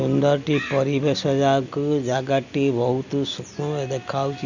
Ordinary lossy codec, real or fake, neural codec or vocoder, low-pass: none; real; none; 7.2 kHz